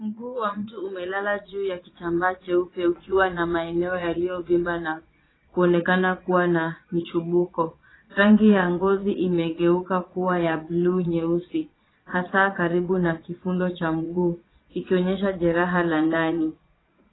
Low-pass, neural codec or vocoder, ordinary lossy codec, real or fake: 7.2 kHz; vocoder, 22.05 kHz, 80 mel bands, Vocos; AAC, 16 kbps; fake